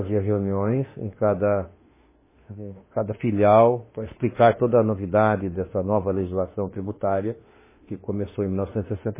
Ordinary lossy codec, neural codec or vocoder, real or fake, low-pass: MP3, 16 kbps; autoencoder, 48 kHz, 32 numbers a frame, DAC-VAE, trained on Japanese speech; fake; 3.6 kHz